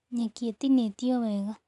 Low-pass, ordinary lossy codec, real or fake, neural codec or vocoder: 10.8 kHz; none; real; none